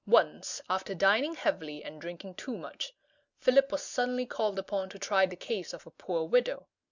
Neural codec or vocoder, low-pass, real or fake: none; 7.2 kHz; real